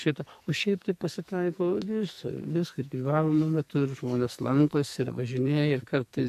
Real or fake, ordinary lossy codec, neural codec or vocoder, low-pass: fake; AAC, 96 kbps; codec, 44.1 kHz, 2.6 kbps, SNAC; 14.4 kHz